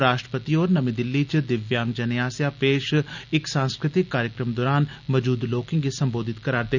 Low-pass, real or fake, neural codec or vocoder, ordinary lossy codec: 7.2 kHz; real; none; none